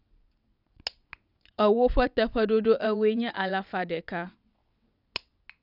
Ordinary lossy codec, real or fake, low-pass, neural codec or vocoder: none; fake; 5.4 kHz; vocoder, 22.05 kHz, 80 mel bands, WaveNeXt